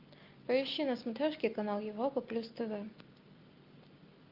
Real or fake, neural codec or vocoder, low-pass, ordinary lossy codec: real; none; 5.4 kHz; Opus, 24 kbps